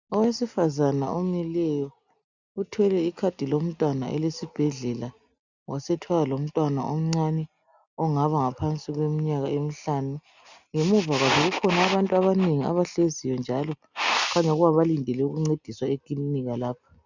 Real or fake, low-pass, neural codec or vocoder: real; 7.2 kHz; none